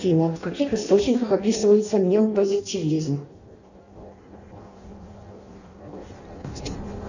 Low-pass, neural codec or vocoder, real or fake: 7.2 kHz; codec, 16 kHz in and 24 kHz out, 0.6 kbps, FireRedTTS-2 codec; fake